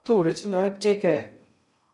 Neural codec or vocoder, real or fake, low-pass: codec, 16 kHz in and 24 kHz out, 0.6 kbps, FocalCodec, streaming, 2048 codes; fake; 10.8 kHz